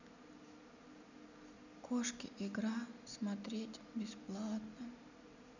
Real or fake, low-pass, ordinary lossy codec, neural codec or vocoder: real; 7.2 kHz; none; none